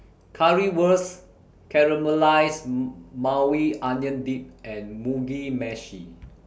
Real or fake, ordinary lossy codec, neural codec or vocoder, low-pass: real; none; none; none